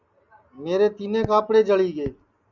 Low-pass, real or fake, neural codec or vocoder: 7.2 kHz; real; none